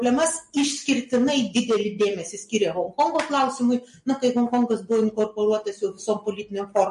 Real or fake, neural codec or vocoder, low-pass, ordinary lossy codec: real; none; 14.4 kHz; MP3, 48 kbps